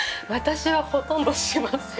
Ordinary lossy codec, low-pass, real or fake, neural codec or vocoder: none; none; real; none